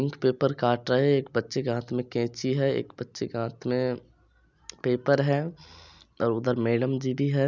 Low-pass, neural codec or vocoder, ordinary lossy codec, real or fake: 7.2 kHz; none; none; real